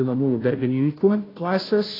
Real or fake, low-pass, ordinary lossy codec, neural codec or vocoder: fake; 5.4 kHz; AAC, 24 kbps; codec, 16 kHz, 0.5 kbps, FunCodec, trained on Chinese and English, 25 frames a second